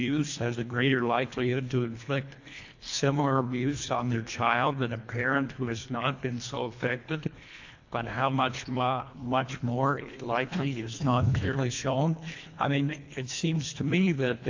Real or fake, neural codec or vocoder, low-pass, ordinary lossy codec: fake; codec, 24 kHz, 1.5 kbps, HILCodec; 7.2 kHz; AAC, 48 kbps